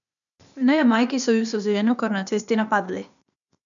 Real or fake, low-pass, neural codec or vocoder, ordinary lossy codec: fake; 7.2 kHz; codec, 16 kHz, 0.8 kbps, ZipCodec; none